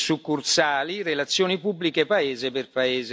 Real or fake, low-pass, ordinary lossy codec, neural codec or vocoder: real; none; none; none